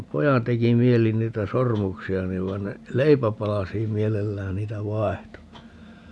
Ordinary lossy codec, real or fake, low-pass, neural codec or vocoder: none; real; none; none